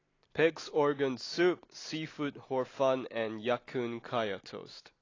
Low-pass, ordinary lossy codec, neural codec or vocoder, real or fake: 7.2 kHz; AAC, 32 kbps; none; real